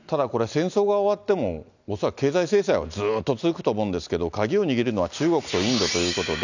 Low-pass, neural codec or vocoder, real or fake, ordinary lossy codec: 7.2 kHz; none; real; none